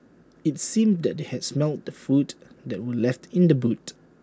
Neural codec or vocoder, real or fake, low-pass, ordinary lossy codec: none; real; none; none